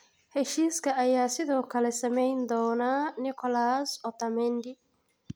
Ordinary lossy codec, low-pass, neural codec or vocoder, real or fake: none; none; none; real